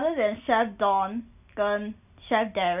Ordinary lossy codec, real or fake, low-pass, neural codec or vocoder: none; real; 3.6 kHz; none